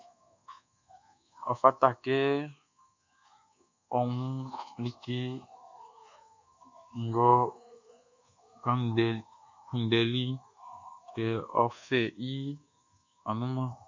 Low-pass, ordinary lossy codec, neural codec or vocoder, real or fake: 7.2 kHz; MP3, 64 kbps; codec, 24 kHz, 1.2 kbps, DualCodec; fake